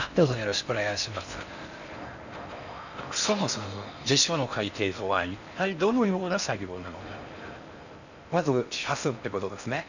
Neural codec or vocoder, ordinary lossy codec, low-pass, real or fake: codec, 16 kHz in and 24 kHz out, 0.6 kbps, FocalCodec, streaming, 4096 codes; none; 7.2 kHz; fake